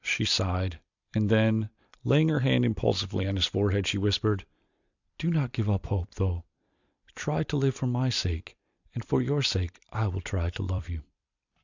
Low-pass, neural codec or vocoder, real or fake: 7.2 kHz; vocoder, 44.1 kHz, 128 mel bands every 256 samples, BigVGAN v2; fake